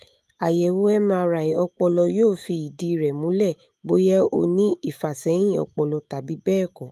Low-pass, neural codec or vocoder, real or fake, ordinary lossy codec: 14.4 kHz; autoencoder, 48 kHz, 128 numbers a frame, DAC-VAE, trained on Japanese speech; fake; Opus, 32 kbps